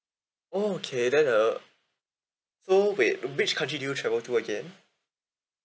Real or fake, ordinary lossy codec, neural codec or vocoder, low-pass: real; none; none; none